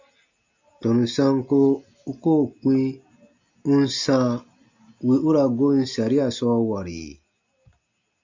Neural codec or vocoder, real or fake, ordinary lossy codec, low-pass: none; real; MP3, 64 kbps; 7.2 kHz